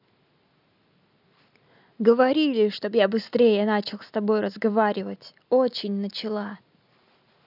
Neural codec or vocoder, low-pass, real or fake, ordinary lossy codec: none; 5.4 kHz; real; none